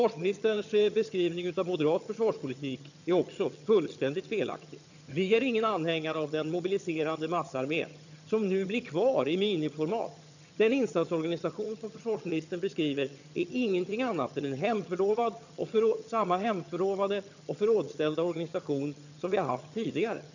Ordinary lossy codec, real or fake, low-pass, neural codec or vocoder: none; fake; 7.2 kHz; vocoder, 22.05 kHz, 80 mel bands, HiFi-GAN